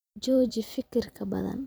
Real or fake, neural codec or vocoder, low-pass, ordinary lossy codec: fake; vocoder, 44.1 kHz, 128 mel bands every 256 samples, BigVGAN v2; none; none